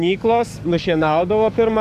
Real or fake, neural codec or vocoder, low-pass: fake; codec, 44.1 kHz, 7.8 kbps, DAC; 14.4 kHz